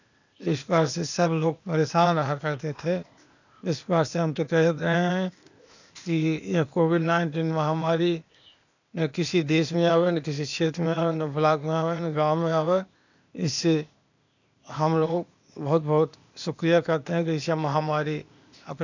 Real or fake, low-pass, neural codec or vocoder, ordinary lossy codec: fake; 7.2 kHz; codec, 16 kHz, 0.8 kbps, ZipCodec; none